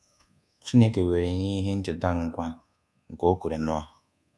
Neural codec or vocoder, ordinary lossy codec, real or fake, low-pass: codec, 24 kHz, 1.2 kbps, DualCodec; none; fake; none